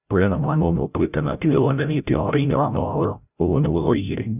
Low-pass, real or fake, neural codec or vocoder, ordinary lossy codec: 3.6 kHz; fake; codec, 16 kHz, 0.5 kbps, FreqCodec, larger model; none